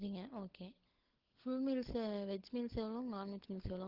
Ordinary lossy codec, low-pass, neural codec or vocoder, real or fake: Opus, 16 kbps; 5.4 kHz; codec, 16 kHz, 16 kbps, FunCodec, trained on LibriTTS, 50 frames a second; fake